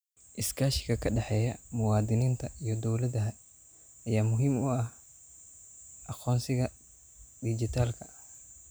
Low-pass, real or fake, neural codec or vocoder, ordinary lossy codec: none; real; none; none